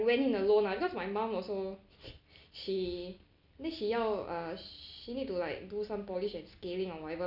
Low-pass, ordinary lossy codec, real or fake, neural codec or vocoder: 5.4 kHz; none; real; none